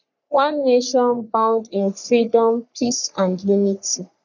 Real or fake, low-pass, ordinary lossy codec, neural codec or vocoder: fake; 7.2 kHz; none; codec, 44.1 kHz, 3.4 kbps, Pupu-Codec